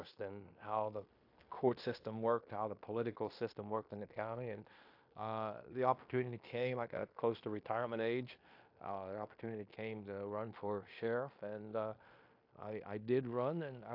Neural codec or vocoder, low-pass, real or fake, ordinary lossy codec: codec, 16 kHz in and 24 kHz out, 0.9 kbps, LongCat-Audio-Codec, fine tuned four codebook decoder; 5.4 kHz; fake; AAC, 48 kbps